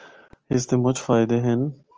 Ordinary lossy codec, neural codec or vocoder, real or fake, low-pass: Opus, 24 kbps; none; real; 7.2 kHz